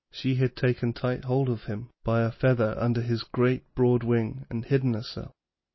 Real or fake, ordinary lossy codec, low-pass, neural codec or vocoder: real; MP3, 24 kbps; 7.2 kHz; none